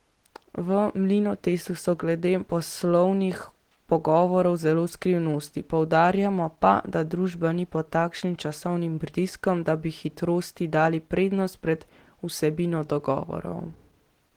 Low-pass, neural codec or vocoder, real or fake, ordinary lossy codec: 19.8 kHz; none; real; Opus, 16 kbps